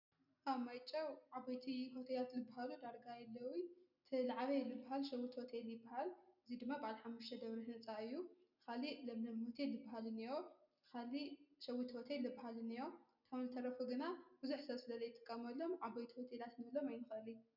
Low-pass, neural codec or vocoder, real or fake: 5.4 kHz; none; real